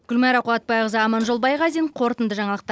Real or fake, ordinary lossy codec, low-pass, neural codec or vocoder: real; none; none; none